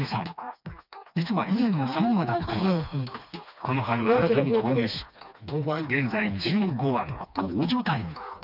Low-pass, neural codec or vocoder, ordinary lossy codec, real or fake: 5.4 kHz; codec, 16 kHz, 2 kbps, FreqCodec, smaller model; none; fake